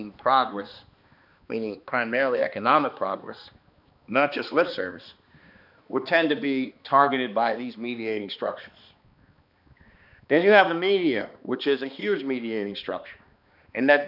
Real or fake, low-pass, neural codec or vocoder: fake; 5.4 kHz; codec, 16 kHz, 2 kbps, X-Codec, HuBERT features, trained on balanced general audio